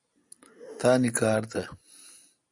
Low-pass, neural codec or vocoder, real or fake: 10.8 kHz; none; real